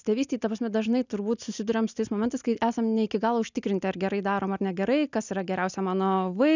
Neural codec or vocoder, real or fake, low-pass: none; real; 7.2 kHz